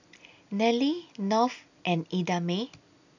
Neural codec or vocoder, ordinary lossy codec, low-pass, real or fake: none; none; 7.2 kHz; real